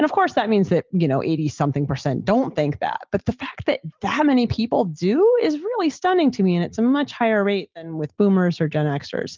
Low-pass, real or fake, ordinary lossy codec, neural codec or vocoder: 7.2 kHz; real; Opus, 32 kbps; none